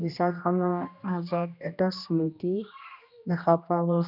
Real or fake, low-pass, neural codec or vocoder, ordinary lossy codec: fake; 5.4 kHz; codec, 16 kHz, 1 kbps, X-Codec, HuBERT features, trained on general audio; none